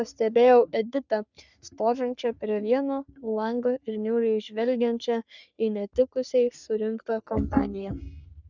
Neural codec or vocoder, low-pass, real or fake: codec, 44.1 kHz, 3.4 kbps, Pupu-Codec; 7.2 kHz; fake